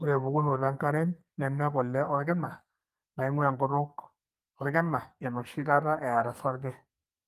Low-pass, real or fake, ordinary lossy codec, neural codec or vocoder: 14.4 kHz; fake; Opus, 32 kbps; codec, 44.1 kHz, 2.6 kbps, SNAC